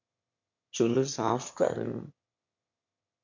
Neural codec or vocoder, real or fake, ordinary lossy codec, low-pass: autoencoder, 22.05 kHz, a latent of 192 numbers a frame, VITS, trained on one speaker; fake; MP3, 48 kbps; 7.2 kHz